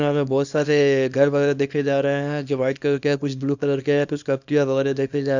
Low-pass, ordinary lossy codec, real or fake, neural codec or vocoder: 7.2 kHz; none; fake; codec, 24 kHz, 0.9 kbps, WavTokenizer, small release